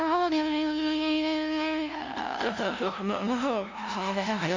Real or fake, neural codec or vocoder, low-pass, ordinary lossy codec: fake; codec, 16 kHz, 0.5 kbps, FunCodec, trained on LibriTTS, 25 frames a second; 7.2 kHz; MP3, 64 kbps